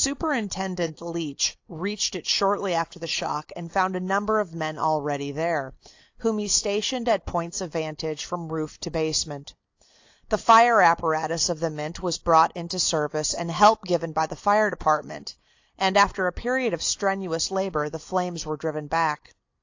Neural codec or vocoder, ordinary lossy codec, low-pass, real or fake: none; AAC, 48 kbps; 7.2 kHz; real